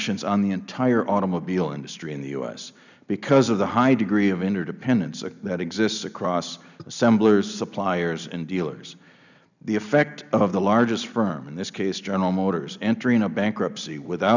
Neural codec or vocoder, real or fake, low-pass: none; real; 7.2 kHz